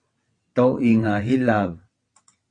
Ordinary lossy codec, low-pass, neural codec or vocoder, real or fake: Opus, 64 kbps; 9.9 kHz; vocoder, 22.05 kHz, 80 mel bands, WaveNeXt; fake